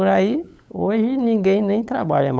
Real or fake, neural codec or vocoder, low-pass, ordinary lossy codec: fake; codec, 16 kHz, 8 kbps, FunCodec, trained on LibriTTS, 25 frames a second; none; none